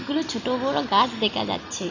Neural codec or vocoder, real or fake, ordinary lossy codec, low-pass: none; real; none; 7.2 kHz